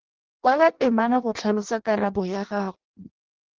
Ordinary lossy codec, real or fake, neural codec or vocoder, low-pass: Opus, 16 kbps; fake; codec, 16 kHz in and 24 kHz out, 0.6 kbps, FireRedTTS-2 codec; 7.2 kHz